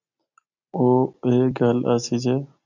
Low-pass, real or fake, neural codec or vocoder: 7.2 kHz; real; none